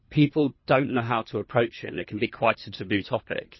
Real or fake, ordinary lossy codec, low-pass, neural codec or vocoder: fake; MP3, 24 kbps; 7.2 kHz; codec, 24 kHz, 3 kbps, HILCodec